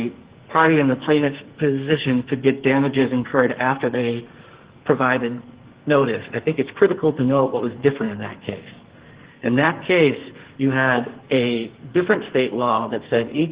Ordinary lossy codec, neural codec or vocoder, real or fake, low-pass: Opus, 16 kbps; codec, 44.1 kHz, 2.6 kbps, SNAC; fake; 3.6 kHz